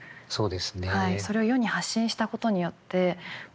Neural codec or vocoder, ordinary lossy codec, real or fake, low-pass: none; none; real; none